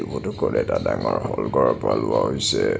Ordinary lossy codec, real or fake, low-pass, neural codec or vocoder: none; real; none; none